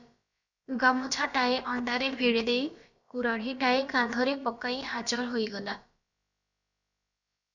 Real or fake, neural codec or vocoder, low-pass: fake; codec, 16 kHz, about 1 kbps, DyCAST, with the encoder's durations; 7.2 kHz